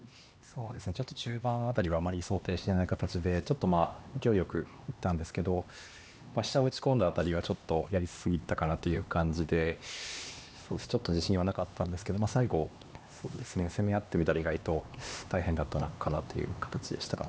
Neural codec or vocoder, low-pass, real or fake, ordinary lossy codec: codec, 16 kHz, 2 kbps, X-Codec, HuBERT features, trained on LibriSpeech; none; fake; none